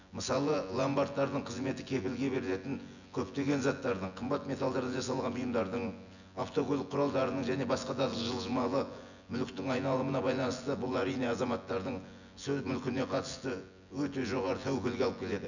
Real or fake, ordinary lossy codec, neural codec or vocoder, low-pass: fake; none; vocoder, 24 kHz, 100 mel bands, Vocos; 7.2 kHz